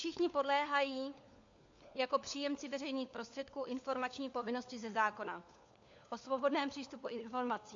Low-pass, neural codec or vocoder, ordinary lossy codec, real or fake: 7.2 kHz; codec, 16 kHz, 4 kbps, FunCodec, trained on LibriTTS, 50 frames a second; AAC, 64 kbps; fake